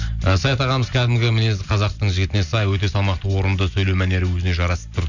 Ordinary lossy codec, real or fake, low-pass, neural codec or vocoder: none; real; 7.2 kHz; none